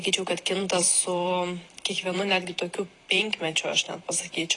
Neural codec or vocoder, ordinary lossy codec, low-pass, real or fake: vocoder, 44.1 kHz, 128 mel bands every 512 samples, BigVGAN v2; AAC, 32 kbps; 10.8 kHz; fake